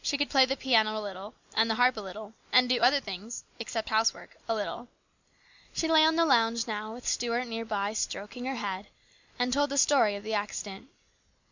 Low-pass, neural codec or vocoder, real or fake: 7.2 kHz; none; real